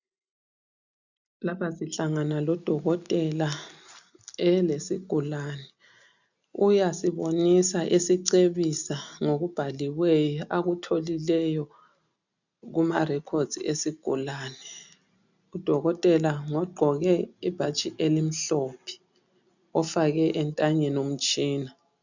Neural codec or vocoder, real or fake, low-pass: none; real; 7.2 kHz